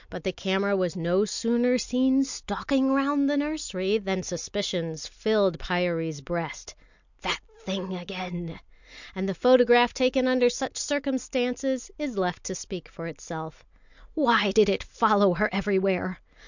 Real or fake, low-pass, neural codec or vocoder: real; 7.2 kHz; none